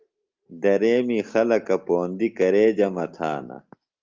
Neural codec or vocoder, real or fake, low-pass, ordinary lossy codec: none; real; 7.2 kHz; Opus, 24 kbps